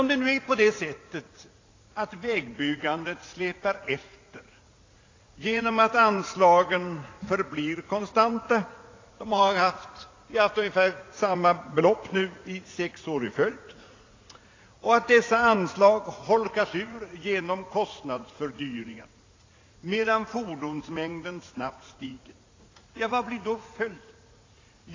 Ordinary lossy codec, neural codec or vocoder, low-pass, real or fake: AAC, 32 kbps; vocoder, 44.1 kHz, 80 mel bands, Vocos; 7.2 kHz; fake